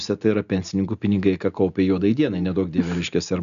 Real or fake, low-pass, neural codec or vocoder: real; 7.2 kHz; none